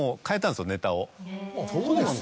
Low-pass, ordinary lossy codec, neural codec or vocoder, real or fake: none; none; none; real